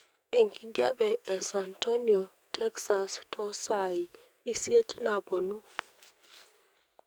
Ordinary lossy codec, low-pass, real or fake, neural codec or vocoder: none; none; fake; codec, 44.1 kHz, 2.6 kbps, SNAC